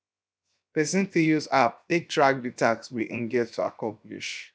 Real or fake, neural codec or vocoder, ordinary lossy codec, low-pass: fake; codec, 16 kHz, 0.7 kbps, FocalCodec; none; none